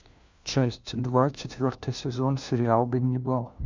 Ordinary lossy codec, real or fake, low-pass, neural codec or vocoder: MP3, 64 kbps; fake; 7.2 kHz; codec, 16 kHz, 1 kbps, FunCodec, trained on LibriTTS, 50 frames a second